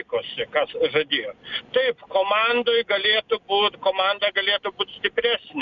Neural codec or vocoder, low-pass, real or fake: none; 7.2 kHz; real